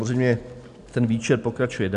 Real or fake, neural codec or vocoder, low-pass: real; none; 10.8 kHz